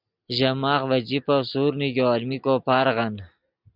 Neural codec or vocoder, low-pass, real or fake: none; 5.4 kHz; real